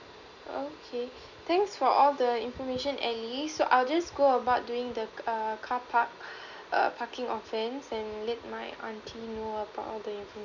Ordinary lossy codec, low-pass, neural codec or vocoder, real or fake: none; 7.2 kHz; none; real